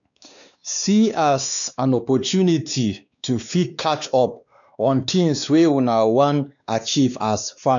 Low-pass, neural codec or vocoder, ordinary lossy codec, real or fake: 7.2 kHz; codec, 16 kHz, 2 kbps, X-Codec, WavLM features, trained on Multilingual LibriSpeech; none; fake